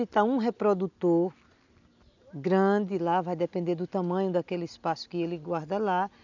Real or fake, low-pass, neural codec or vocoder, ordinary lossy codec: real; 7.2 kHz; none; none